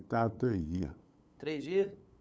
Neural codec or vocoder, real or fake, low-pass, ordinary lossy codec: codec, 16 kHz, 8 kbps, FunCodec, trained on LibriTTS, 25 frames a second; fake; none; none